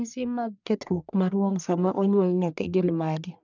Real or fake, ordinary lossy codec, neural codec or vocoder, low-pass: fake; none; codec, 44.1 kHz, 1.7 kbps, Pupu-Codec; 7.2 kHz